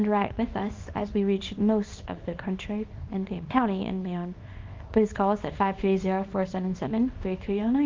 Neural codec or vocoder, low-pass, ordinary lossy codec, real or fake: codec, 24 kHz, 0.9 kbps, WavTokenizer, small release; 7.2 kHz; Opus, 24 kbps; fake